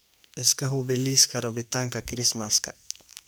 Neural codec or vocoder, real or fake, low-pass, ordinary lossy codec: codec, 44.1 kHz, 2.6 kbps, SNAC; fake; none; none